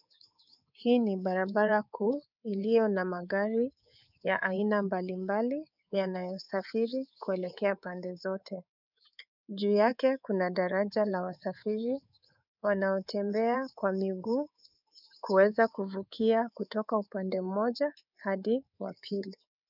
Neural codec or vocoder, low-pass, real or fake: codec, 24 kHz, 3.1 kbps, DualCodec; 5.4 kHz; fake